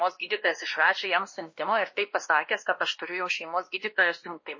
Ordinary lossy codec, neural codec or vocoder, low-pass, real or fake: MP3, 32 kbps; codec, 16 kHz, 1 kbps, X-Codec, WavLM features, trained on Multilingual LibriSpeech; 7.2 kHz; fake